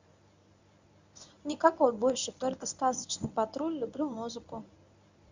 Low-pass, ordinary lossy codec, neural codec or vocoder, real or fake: 7.2 kHz; Opus, 64 kbps; codec, 24 kHz, 0.9 kbps, WavTokenizer, medium speech release version 1; fake